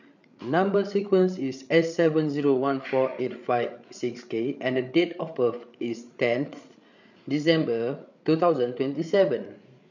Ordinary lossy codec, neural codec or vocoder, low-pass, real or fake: none; codec, 16 kHz, 8 kbps, FreqCodec, larger model; 7.2 kHz; fake